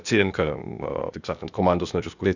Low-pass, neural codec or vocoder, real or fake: 7.2 kHz; codec, 16 kHz, 0.8 kbps, ZipCodec; fake